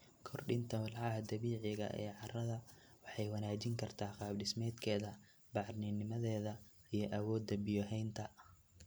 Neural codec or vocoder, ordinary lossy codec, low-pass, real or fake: none; none; none; real